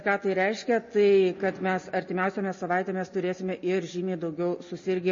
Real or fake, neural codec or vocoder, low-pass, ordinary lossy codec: real; none; 7.2 kHz; MP3, 32 kbps